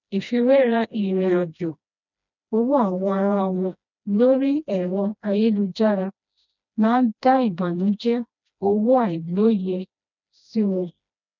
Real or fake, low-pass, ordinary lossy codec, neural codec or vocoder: fake; 7.2 kHz; none; codec, 16 kHz, 1 kbps, FreqCodec, smaller model